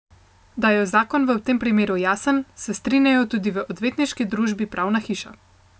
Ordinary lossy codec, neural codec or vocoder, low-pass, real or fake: none; none; none; real